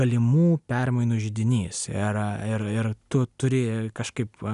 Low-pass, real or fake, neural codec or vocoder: 10.8 kHz; real; none